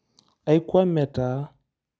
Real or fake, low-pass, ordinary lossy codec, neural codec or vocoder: real; none; none; none